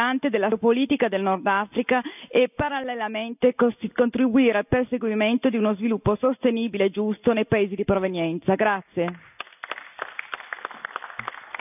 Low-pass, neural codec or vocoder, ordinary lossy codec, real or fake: 3.6 kHz; none; none; real